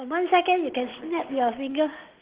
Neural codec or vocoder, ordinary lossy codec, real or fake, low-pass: none; Opus, 16 kbps; real; 3.6 kHz